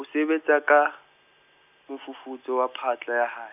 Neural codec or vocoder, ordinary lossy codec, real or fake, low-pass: none; none; real; 3.6 kHz